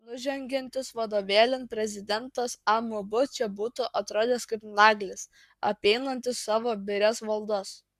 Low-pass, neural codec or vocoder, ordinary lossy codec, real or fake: 14.4 kHz; codec, 44.1 kHz, 7.8 kbps, Pupu-Codec; Opus, 64 kbps; fake